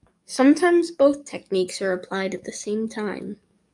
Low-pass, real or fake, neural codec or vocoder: 10.8 kHz; fake; codec, 44.1 kHz, 7.8 kbps, DAC